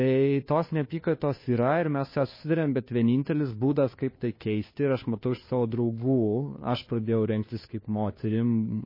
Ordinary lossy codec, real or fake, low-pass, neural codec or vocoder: MP3, 24 kbps; fake; 5.4 kHz; codec, 16 kHz, 2 kbps, FunCodec, trained on Chinese and English, 25 frames a second